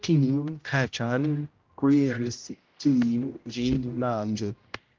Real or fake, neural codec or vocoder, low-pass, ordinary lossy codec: fake; codec, 16 kHz, 0.5 kbps, X-Codec, HuBERT features, trained on general audio; 7.2 kHz; Opus, 32 kbps